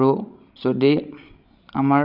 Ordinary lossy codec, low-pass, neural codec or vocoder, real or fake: none; 5.4 kHz; codec, 16 kHz, 16 kbps, FreqCodec, larger model; fake